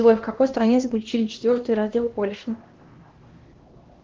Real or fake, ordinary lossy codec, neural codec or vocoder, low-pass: fake; Opus, 16 kbps; codec, 16 kHz, 1 kbps, X-Codec, HuBERT features, trained on LibriSpeech; 7.2 kHz